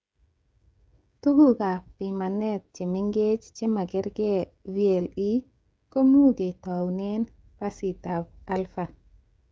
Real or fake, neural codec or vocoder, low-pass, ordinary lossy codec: fake; codec, 16 kHz, 16 kbps, FreqCodec, smaller model; none; none